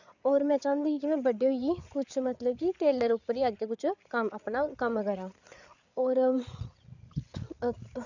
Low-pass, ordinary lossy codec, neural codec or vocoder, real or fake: 7.2 kHz; none; codec, 16 kHz, 8 kbps, FreqCodec, larger model; fake